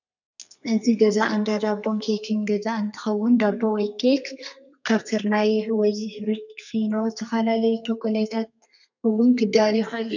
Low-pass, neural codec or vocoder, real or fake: 7.2 kHz; codec, 32 kHz, 1.9 kbps, SNAC; fake